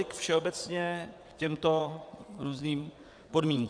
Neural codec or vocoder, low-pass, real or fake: vocoder, 22.05 kHz, 80 mel bands, WaveNeXt; 9.9 kHz; fake